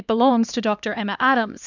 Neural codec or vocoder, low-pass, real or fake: codec, 16 kHz, 2 kbps, X-Codec, HuBERT features, trained on LibriSpeech; 7.2 kHz; fake